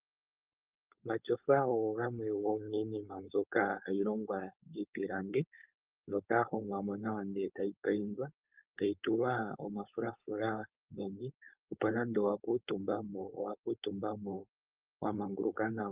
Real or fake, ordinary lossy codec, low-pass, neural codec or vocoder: fake; Opus, 24 kbps; 3.6 kHz; codec, 16 kHz, 4.8 kbps, FACodec